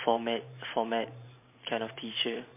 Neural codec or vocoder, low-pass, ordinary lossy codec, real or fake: vocoder, 44.1 kHz, 128 mel bands every 512 samples, BigVGAN v2; 3.6 kHz; MP3, 24 kbps; fake